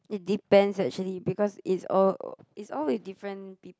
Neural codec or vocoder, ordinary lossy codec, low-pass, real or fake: none; none; none; real